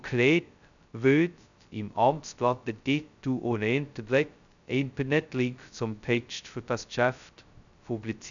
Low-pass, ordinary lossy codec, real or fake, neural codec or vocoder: 7.2 kHz; none; fake; codec, 16 kHz, 0.2 kbps, FocalCodec